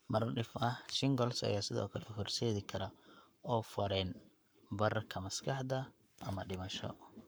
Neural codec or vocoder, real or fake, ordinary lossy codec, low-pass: codec, 44.1 kHz, 7.8 kbps, Pupu-Codec; fake; none; none